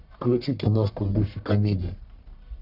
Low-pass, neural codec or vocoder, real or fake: 5.4 kHz; codec, 44.1 kHz, 1.7 kbps, Pupu-Codec; fake